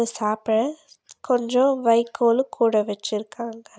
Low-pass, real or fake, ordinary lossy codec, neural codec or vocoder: none; real; none; none